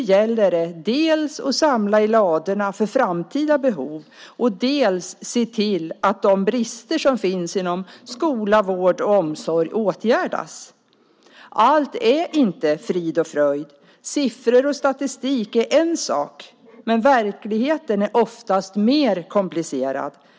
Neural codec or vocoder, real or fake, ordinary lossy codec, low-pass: none; real; none; none